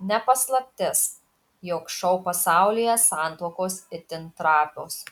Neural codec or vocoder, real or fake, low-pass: none; real; 19.8 kHz